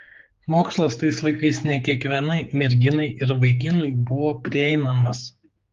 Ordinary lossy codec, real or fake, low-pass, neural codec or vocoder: Opus, 32 kbps; fake; 7.2 kHz; codec, 16 kHz, 4 kbps, X-Codec, HuBERT features, trained on general audio